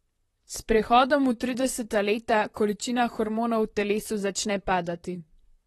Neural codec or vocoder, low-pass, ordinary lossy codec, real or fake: vocoder, 44.1 kHz, 128 mel bands, Pupu-Vocoder; 19.8 kHz; AAC, 32 kbps; fake